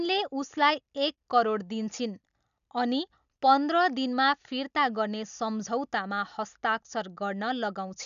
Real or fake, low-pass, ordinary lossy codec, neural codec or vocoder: real; 7.2 kHz; none; none